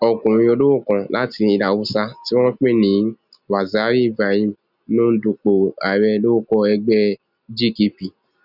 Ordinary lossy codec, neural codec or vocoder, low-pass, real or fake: none; none; 5.4 kHz; real